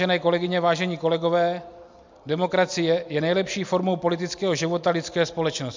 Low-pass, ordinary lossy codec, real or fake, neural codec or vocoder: 7.2 kHz; MP3, 64 kbps; real; none